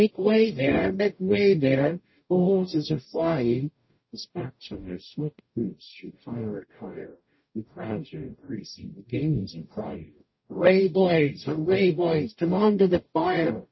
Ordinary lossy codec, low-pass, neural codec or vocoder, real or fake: MP3, 24 kbps; 7.2 kHz; codec, 44.1 kHz, 0.9 kbps, DAC; fake